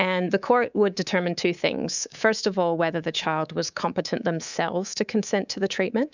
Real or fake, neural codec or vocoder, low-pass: fake; codec, 24 kHz, 3.1 kbps, DualCodec; 7.2 kHz